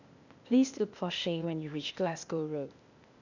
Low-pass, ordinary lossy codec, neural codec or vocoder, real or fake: 7.2 kHz; none; codec, 16 kHz, 0.8 kbps, ZipCodec; fake